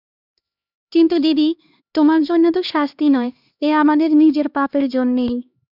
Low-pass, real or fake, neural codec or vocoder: 5.4 kHz; fake; codec, 16 kHz, 2 kbps, X-Codec, HuBERT features, trained on LibriSpeech